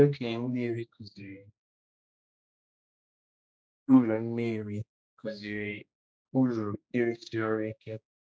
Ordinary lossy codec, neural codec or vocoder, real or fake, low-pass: none; codec, 16 kHz, 1 kbps, X-Codec, HuBERT features, trained on general audio; fake; none